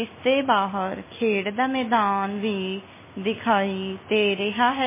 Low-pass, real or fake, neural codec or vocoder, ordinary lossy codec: 3.6 kHz; real; none; MP3, 16 kbps